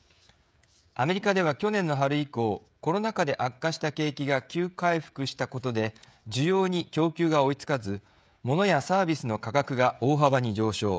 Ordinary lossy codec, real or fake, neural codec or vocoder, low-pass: none; fake; codec, 16 kHz, 16 kbps, FreqCodec, smaller model; none